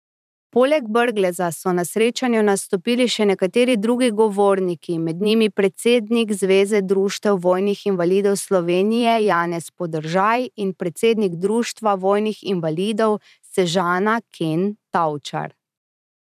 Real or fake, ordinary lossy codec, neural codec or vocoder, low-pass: fake; none; vocoder, 44.1 kHz, 128 mel bands every 512 samples, BigVGAN v2; 14.4 kHz